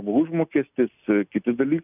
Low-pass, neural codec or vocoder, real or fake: 3.6 kHz; none; real